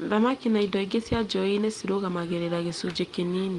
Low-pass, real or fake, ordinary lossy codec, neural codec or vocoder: 10.8 kHz; real; Opus, 24 kbps; none